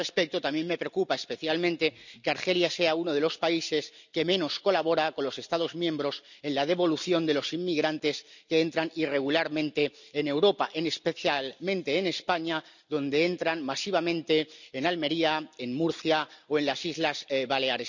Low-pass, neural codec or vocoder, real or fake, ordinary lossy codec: 7.2 kHz; none; real; none